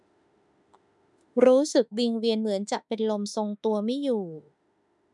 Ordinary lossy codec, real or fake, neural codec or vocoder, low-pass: none; fake; autoencoder, 48 kHz, 32 numbers a frame, DAC-VAE, trained on Japanese speech; 10.8 kHz